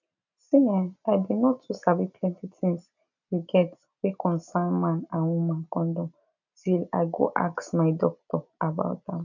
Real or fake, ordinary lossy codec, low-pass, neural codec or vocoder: real; none; 7.2 kHz; none